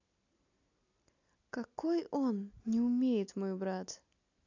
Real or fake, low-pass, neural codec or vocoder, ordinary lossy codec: real; 7.2 kHz; none; AAC, 48 kbps